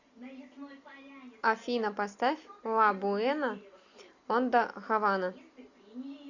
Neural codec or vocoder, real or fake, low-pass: none; real; 7.2 kHz